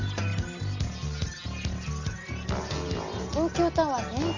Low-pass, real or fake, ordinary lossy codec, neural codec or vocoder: 7.2 kHz; real; none; none